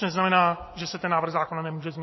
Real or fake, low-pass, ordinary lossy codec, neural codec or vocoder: real; 7.2 kHz; MP3, 24 kbps; none